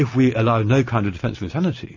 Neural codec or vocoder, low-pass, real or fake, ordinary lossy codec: none; 7.2 kHz; real; MP3, 32 kbps